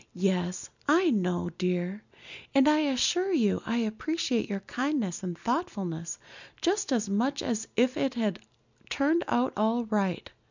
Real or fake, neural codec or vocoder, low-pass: real; none; 7.2 kHz